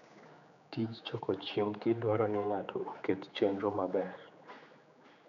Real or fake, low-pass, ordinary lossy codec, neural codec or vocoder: fake; 7.2 kHz; none; codec, 16 kHz, 4 kbps, X-Codec, HuBERT features, trained on general audio